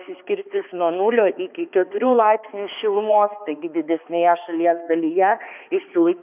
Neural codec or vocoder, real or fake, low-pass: codec, 16 kHz, 2 kbps, X-Codec, HuBERT features, trained on balanced general audio; fake; 3.6 kHz